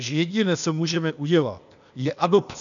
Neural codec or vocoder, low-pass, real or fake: codec, 16 kHz, 0.8 kbps, ZipCodec; 7.2 kHz; fake